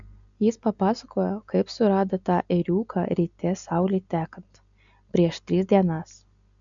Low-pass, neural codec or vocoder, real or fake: 7.2 kHz; none; real